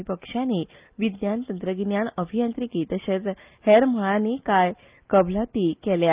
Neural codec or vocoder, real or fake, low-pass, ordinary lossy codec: none; real; 3.6 kHz; Opus, 24 kbps